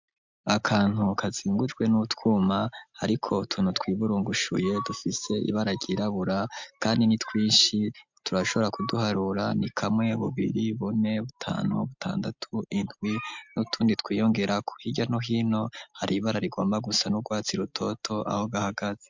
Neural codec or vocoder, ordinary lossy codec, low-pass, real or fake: none; MP3, 64 kbps; 7.2 kHz; real